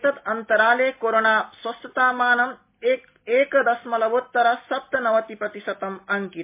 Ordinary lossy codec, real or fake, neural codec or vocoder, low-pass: MP3, 24 kbps; real; none; 3.6 kHz